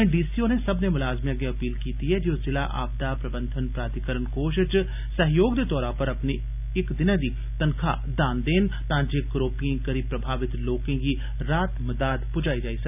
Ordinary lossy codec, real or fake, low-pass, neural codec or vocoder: none; real; 3.6 kHz; none